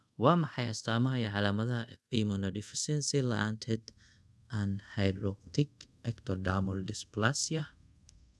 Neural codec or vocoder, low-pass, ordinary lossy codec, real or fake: codec, 24 kHz, 0.5 kbps, DualCodec; none; none; fake